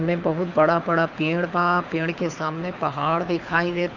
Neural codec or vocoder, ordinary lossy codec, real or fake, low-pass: codec, 24 kHz, 6 kbps, HILCodec; none; fake; 7.2 kHz